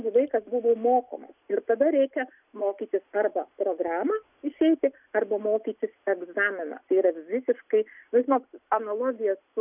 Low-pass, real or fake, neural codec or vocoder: 3.6 kHz; real; none